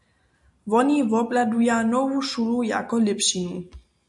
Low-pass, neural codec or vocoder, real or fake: 10.8 kHz; none; real